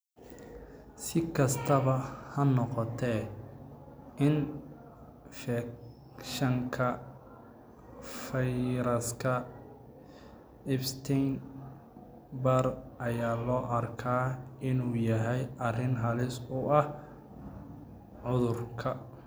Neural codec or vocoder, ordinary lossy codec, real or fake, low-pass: none; none; real; none